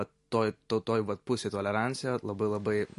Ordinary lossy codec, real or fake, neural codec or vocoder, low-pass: MP3, 48 kbps; real; none; 14.4 kHz